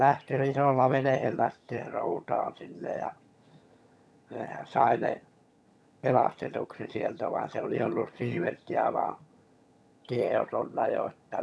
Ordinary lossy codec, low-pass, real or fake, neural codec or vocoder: none; none; fake; vocoder, 22.05 kHz, 80 mel bands, HiFi-GAN